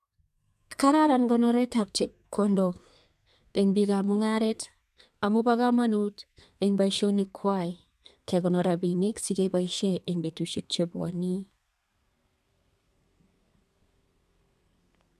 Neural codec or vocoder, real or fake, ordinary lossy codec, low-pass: codec, 32 kHz, 1.9 kbps, SNAC; fake; none; 14.4 kHz